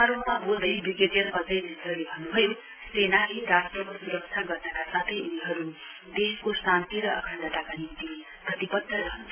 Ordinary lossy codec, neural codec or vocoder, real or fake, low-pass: AAC, 24 kbps; none; real; 3.6 kHz